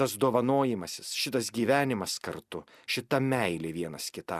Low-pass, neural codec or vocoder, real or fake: 14.4 kHz; none; real